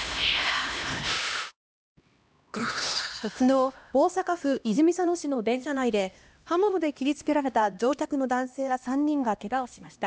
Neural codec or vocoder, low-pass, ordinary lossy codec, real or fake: codec, 16 kHz, 1 kbps, X-Codec, HuBERT features, trained on LibriSpeech; none; none; fake